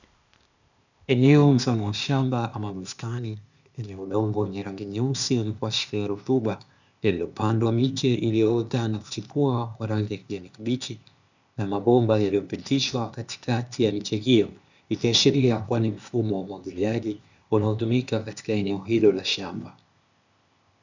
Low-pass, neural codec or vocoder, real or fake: 7.2 kHz; codec, 16 kHz, 0.8 kbps, ZipCodec; fake